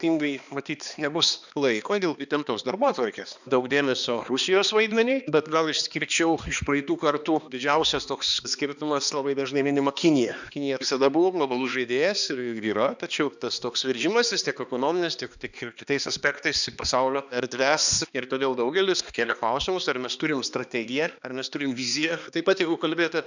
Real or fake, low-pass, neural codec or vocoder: fake; 7.2 kHz; codec, 16 kHz, 2 kbps, X-Codec, HuBERT features, trained on balanced general audio